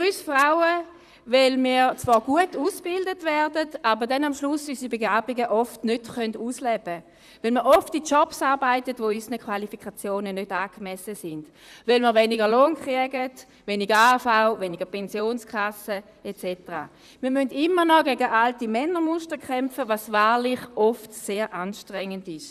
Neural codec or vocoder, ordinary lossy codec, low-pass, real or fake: vocoder, 44.1 kHz, 128 mel bands, Pupu-Vocoder; none; 14.4 kHz; fake